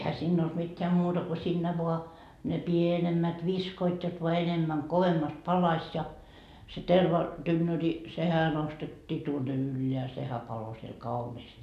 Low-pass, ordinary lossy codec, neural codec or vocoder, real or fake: 14.4 kHz; none; none; real